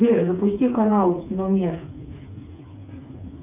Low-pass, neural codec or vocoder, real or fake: 3.6 kHz; codec, 16 kHz, 4 kbps, FreqCodec, smaller model; fake